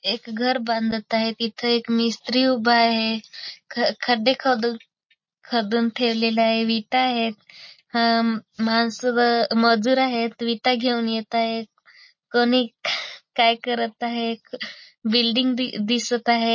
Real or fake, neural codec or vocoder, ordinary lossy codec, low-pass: real; none; MP3, 32 kbps; 7.2 kHz